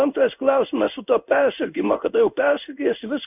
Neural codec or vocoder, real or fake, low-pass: codec, 16 kHz in and 24 kHz out, 1 kbps, XY-Tokenizer; fake; 3.6 kHz